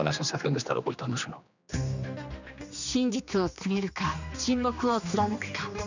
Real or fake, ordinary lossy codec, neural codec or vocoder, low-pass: fake; none; codec, 16 kHz, 2 kbps, X-Codec, HuBERT features, trained on general audio; 7.2 kHz